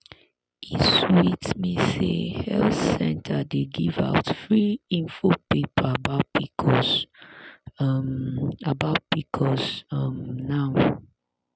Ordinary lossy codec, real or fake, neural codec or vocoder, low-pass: none; real; none; none